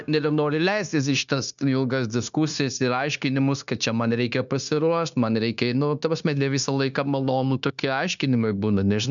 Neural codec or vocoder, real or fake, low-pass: codec, 16 kHz, 0.9 kbps, LongCat-Audio-Codec; fake; 7.2 kHz